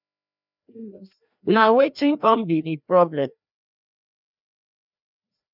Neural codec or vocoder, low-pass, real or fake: codec, 16 kHz, 1 kbps, FreqCodec, larger model; 5.4 kHz; fake